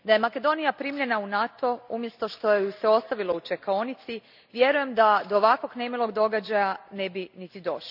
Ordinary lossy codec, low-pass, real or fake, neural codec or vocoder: none; 5.4 kHz; real; none